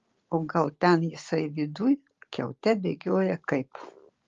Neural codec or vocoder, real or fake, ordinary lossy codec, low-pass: none; real; Opus, 32 kbps; 7.2 kHz